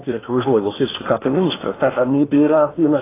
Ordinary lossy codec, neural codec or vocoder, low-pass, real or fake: AAC, 16 kbps; codec, 16 kHz in and 24 kHz out, 0.8 kbps, FocalCodec, streaming, 65536 codes; 3.6 kHz; fake